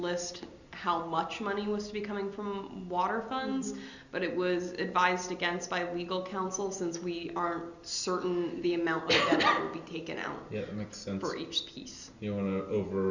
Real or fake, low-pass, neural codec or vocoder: real; 7.2 kHz; none